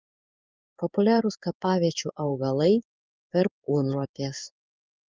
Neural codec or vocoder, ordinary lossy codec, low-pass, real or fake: codec, 16 kHz in and 24 kHz out, 1 kbps, XY-Tokenizer; Opus, 32 kbps; 7.2 kHz; fake